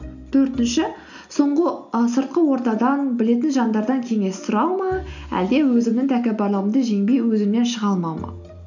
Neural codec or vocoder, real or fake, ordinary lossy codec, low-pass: none; real; none; 7.2 kHz